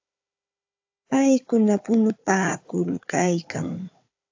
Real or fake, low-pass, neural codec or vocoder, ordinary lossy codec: fake; 7.2 kHz; codec, 16 kHz, 4 kbps, FunCodec, trained on Chinese and English, 50 frames a second; AAC, 48 kbps